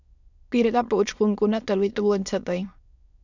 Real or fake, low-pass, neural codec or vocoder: fake; 7.2 kHz; autoencoder, 22.05 kHz, a latent of 192 numbers a frame, VITS, trained on many speakers